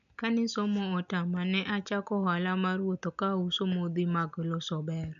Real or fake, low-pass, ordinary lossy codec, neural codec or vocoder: real; 7.2 kHz; none; none